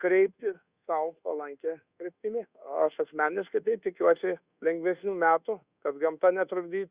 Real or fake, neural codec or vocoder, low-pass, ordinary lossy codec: fake; codec, 24 kHz, 1.2 kbps, DualCodec; 3.6 kHz; Opus, 64 kbps